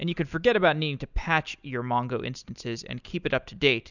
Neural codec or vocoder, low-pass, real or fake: none; 7.2 kHz; real